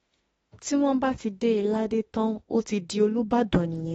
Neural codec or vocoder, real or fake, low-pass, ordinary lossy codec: autoencoder, 48 kHz, 32 numbers a frame, DAC-VAE, trained on Japanese speech; fake; 19.8 kHz; AAC, 24 kbps